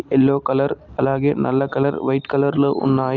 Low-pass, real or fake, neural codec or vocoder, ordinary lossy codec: 7.2 kHz; real; none; Opus, 24 kbps